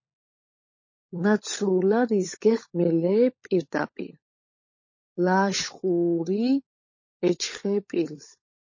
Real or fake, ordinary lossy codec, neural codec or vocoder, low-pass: fake; MP3, 32 kbps; codec, 16 kHz, 16 kbps, FunCodec, trained on LibriTTS, 50 frames a second; 7.2 kHz